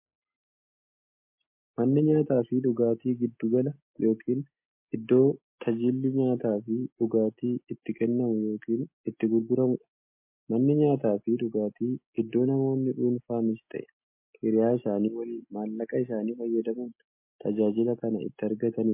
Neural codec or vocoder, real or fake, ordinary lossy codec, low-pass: none; real; MP3, 24 kbps; 3.6 kHz